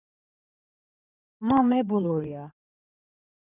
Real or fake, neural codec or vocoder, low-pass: fake; codec, 16 kHz in and 24 kHz out, 2.2 kbps, FireRedTTS-2 codec; 3.6 kHz